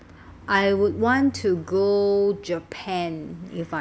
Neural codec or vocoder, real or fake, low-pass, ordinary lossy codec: none; real; none; none